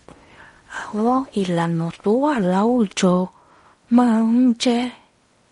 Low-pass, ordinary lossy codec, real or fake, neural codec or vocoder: 10.8 kHz; MP3, 48 kbps; fake; codec, 16 kHz in and 24 kHz out, 0.8 kbps, FocalCodec, streaming, 65536 codes